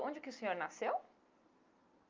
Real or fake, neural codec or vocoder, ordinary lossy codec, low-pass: real; none; Opus, 24 kbps; 7.2 kHz